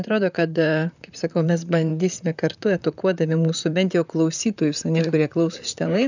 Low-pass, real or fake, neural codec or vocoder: 7.2 kHz; fake; vocoder, 44.1 kHz, 128 mel bands, Pupu-Vocoder